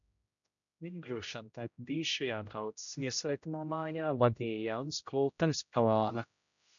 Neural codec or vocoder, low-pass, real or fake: codec, 16 kHz, 0.5 kbps, X-Codec, HuBERT features, trained on general audio; 7.2 kHz; fake